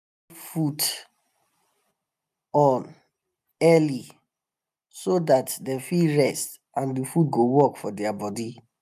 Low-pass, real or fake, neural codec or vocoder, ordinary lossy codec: 14.4 kHz; real; none; none